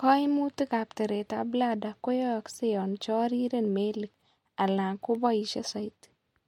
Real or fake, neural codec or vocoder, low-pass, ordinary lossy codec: real; none; 19.8 kHz; MP3, 64 kbps